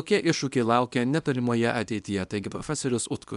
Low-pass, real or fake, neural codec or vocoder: 10.8 kHz; fake; codec, 24 kHz, 0.9 kbps, WavTokenizer, small release